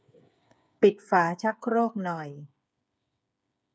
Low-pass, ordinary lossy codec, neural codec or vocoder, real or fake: none; none; codec, 16 kHz, 8 kbps, FreqCodec, smaller model; fake